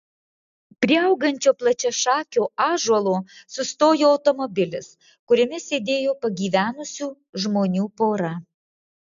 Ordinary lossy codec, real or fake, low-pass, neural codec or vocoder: MP3, 64 kbps; real; 7.2 kHz; none